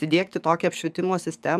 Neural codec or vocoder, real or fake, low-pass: codec, 44.1 kHz, 7.8 kbps, DAC; fake; 14.4 kHz